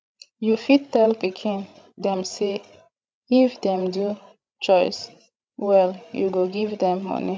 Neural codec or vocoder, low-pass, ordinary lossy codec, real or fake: codec, 16 kHz, 16 kbps, FreqCodec, larger model; none; none; fake